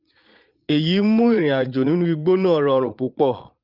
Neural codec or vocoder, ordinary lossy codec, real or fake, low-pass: vocoder, 22.05 kHz, 80 mel bands, Vocos; Opus, 24 kbps; fake; 5.4 kHz